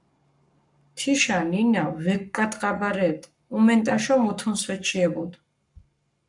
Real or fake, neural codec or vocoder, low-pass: fake; codec, 44.1 kHz, 7.8 kbps, Pupu-Codec; 10.8 kHz